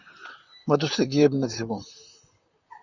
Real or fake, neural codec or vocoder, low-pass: fake; vocoder, 44.1 kHz, 128 mel bands, Pupu-Vocoder; 7.2 kHz